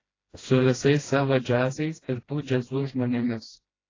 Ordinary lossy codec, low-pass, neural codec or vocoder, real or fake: AAC, 32 kbps; 7.2 kHz; codec, 16 kHz, 1 kbps, FreqCodec, smaller model; fake